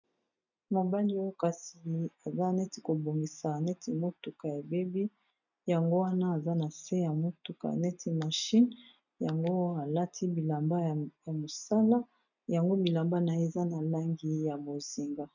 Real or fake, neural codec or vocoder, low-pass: real; none; 7.2 kHz